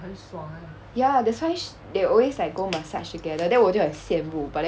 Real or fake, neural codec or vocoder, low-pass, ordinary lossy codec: real; none; none; none